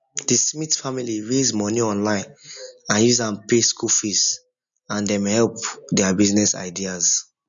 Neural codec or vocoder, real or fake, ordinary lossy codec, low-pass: none; real; none; 7.2 kHz